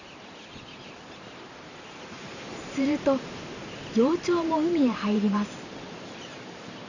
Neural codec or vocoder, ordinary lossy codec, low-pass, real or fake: vocoder, 44.1 kHz, 128 mel bands every 512 samples, BigVGAN v2; none; 7.2 kHz; fake